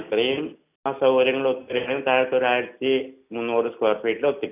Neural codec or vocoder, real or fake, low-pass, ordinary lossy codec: none; real; 3.6 kHz; none